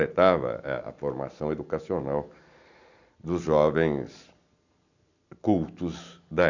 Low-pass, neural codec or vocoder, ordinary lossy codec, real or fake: 7.2 kHz; none; none; real